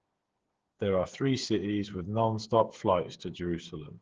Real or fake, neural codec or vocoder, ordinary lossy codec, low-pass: fake; codec, 16 kHz, 8 kbps, FreqCodec, smaller model; Opus, 16 kbps; 7.2 kHz